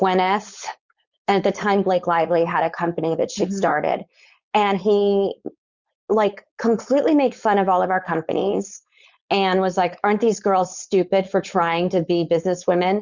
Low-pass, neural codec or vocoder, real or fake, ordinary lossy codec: 7.2 kHz; codec, 16 kHz, 4.8 kbps, FACodec; fake; Opus, 64 kbps